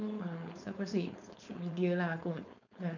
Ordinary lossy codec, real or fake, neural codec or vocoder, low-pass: none; fake; codec, 16 kHz, 4.8 kbps, FACodec; 7.2 kHz